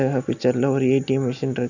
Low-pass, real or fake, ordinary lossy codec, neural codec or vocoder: 7.2 kHz; real; none; none